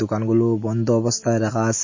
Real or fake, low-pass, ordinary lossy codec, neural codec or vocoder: real; 7.2 kHz; MP3, 32 kbps; none